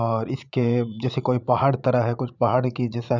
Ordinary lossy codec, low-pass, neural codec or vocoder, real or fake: none; 7.2 kHz; vocoder, 44.1 kHz, 80 mel bands, Vocos; fake